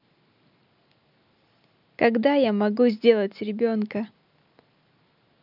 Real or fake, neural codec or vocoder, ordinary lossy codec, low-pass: real; none; none; 5.4 kHz